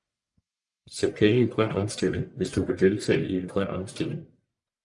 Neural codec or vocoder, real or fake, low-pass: codec, 44.1 kHz, 1.7 kbps, Pupu-Codec; fake; 10.8 kHz